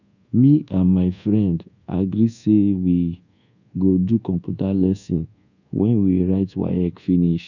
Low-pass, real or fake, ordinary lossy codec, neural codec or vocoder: 7.2 kHz; fake; none; codec, 24 kHz, 1.2 kbps, DualCodec